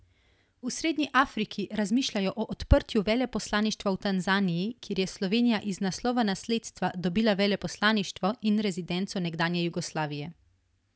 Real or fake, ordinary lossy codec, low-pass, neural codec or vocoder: real; none; none; none